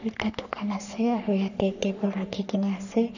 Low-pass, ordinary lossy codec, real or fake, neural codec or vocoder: 7.2 kHz; none; fake; codec, 44.1 kHz, 3.4 kbps, Pupu-Codec